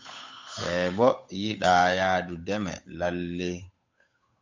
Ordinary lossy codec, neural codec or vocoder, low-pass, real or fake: MP3, 64 kbps; codec, 16 kHz, 8 kbps, FunCodec, trained on Chinese and English, 25 frames a second; 7.2 kHz; fake